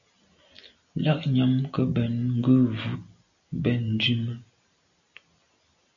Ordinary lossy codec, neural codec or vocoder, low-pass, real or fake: MP3, 96 kbps; none; 7.2 kHz; real